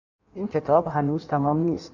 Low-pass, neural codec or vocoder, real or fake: 7.2 kHz; codec, 16 kHz in and 24 kHz out, 1.1 kbps, FireRedTTS-2 codec; fake